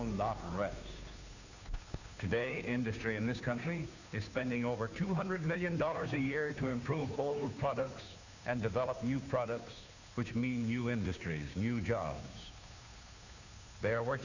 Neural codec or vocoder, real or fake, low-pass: codec, 16 kHz, 2 kbps, FunCodec, trained on Chinese and English, 25 frames a second; fake; 7.2 kHz